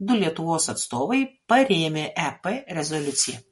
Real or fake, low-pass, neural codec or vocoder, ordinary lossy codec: real; 14.4 kHz; none; MP3, 48 kbps